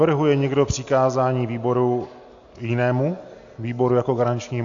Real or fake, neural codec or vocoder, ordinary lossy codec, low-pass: real; none; AAC, 64 kbps; 7.2 kHz